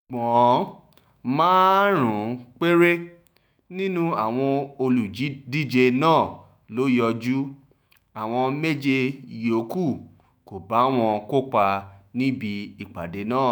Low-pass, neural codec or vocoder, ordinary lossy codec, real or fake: none; autoencoder, 48 kHz, 128 numbers a frame, DAC-VAE, trained on Japanese speech; none; fake